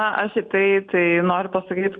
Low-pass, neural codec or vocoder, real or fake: 9.9 kHz; none; real